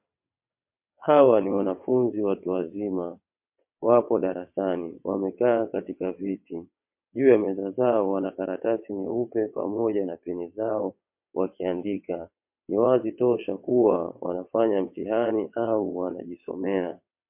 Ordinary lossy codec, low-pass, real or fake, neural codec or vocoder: MP3, 32 kbps; 3.6 kHz; fake; vocoder, 22.05 kHz, 80 mel bands, WaveNeXt